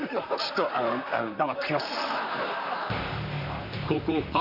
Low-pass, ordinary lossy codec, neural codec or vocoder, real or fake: 5.4 kHz; none; vocoder, 44.1 kHz, 128 mel bands, Pupu-Vocoder; fake